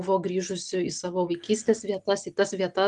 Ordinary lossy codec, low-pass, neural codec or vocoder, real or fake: Opus, 32 kbps; 10.8 kHz; none; real